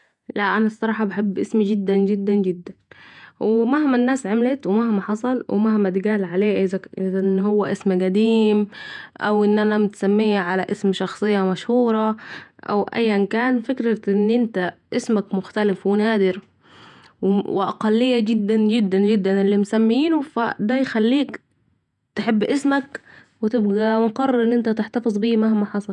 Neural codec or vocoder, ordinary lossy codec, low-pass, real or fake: vocoder, 48 kHz, 128 mel bands, Vocos; none; 10.8 kHz; fake